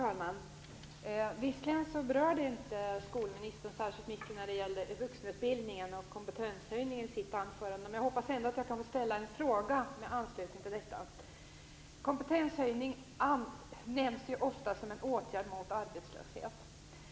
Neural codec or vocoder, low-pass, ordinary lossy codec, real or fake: none; none; none; real